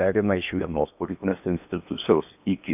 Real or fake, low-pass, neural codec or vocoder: fake; 3.6 kHz; codec, 16 kHz in and 24 kHz out, 0.8 kbps, FocalCodec, streaming, 65536 codes